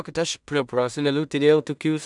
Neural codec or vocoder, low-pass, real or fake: codec, 16 kHz in and 24 kHz out, 0.4 kbps, LongCat-Audio-Codec, two codebook decoder; 10.8 kHz; fake